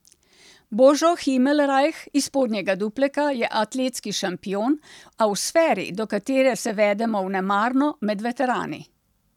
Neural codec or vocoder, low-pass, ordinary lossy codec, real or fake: none; 19.8 kHz; none; real